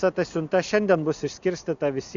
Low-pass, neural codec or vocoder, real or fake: 7.2 kHz; none; real